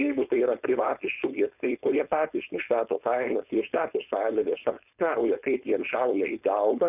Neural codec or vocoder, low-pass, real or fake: codec, 16 kHz, 4.8 kbps, FACodec; 3.6 kHz; fake